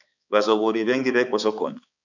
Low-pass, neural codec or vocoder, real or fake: 7.2 kHz; codec, 16 kHz, 4 kbps, X-Codec, HuBERT features, trained on balanced general audio; fake